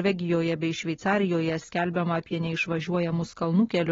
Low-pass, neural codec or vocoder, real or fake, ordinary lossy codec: 7.2 kHz; none; real; AAC, 24 kbps